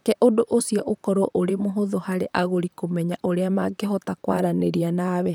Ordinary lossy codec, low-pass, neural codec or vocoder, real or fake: none; none; vocoder, 44.1 kHz, 128 mel bands, Pupu-Vocoder; fake